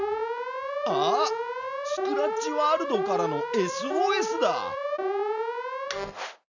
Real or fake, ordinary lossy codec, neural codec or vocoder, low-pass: real; none; none; 7.2 kHz